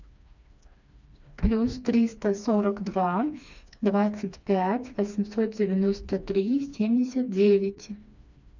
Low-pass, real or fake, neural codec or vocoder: 7.2 kHz; fake; codec, 16 kHz, 2 kbps, FreqCodec, smaller model